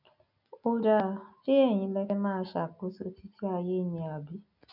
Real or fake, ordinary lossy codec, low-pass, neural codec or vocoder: real; none; 5.4 kHz; none